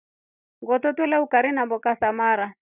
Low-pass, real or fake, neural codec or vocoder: 3.6 kHz; real; none